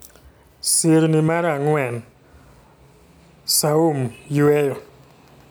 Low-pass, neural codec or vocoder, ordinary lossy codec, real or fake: none; none; none; real